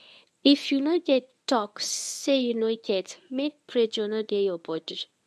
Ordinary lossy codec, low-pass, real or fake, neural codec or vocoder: none; none; fake; codec, 24 kHz, 0.9 kbps, WavTokenizer, medium speech release version 1